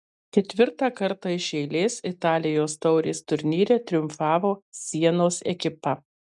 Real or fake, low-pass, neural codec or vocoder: real; 10.8 kHz; none